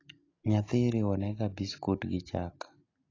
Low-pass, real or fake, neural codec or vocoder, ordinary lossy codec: 7.2 kHz; real; none; AAC, 32 kbps